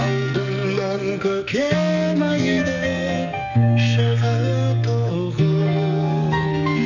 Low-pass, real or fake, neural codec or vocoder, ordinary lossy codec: 7.2 kHz; fake; codec, 44.1 kHz, 2.6 kbps, SNAC; none